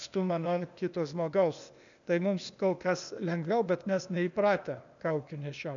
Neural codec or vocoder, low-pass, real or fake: codec, 16 kHz, 0.8 kbps, ZipCodec; 7.2 kHz; fake